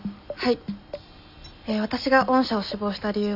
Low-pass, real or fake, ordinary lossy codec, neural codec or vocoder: 5.4 kHz; real; none; none